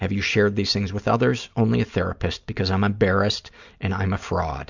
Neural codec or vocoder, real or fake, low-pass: none; real; 7.2 kHz